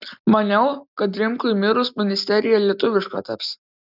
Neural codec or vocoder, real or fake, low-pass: none; real; 5.4 kHz